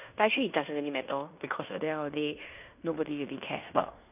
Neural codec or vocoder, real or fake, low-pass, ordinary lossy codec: codec, 16 kHz in and 24 kHz out, 0.9 kbps, LongCat-Audio-Codec, fine tuned four codebook decoder; fake; 3.6 kHz; none